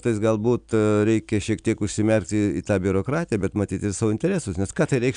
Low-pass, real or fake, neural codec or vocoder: 9.9 kHz; real; none